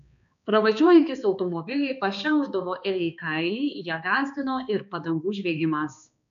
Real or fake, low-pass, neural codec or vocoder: fake; 7.2 kHz; codec, 16 kHz, 2 kbps, X-Codec, HuBERT features, trained on balanced general audio